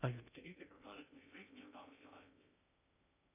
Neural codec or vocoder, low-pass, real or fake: codec, 16 kHz in and 24 kHz out, 0.6 kbps, FocalCodec, streaming, 2048 codes; 3.6 kHz; fake